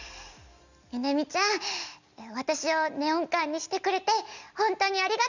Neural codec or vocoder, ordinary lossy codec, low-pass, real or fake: none; none; 7.2 kHz; real